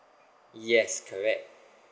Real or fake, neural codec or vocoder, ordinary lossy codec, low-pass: real; none; none; none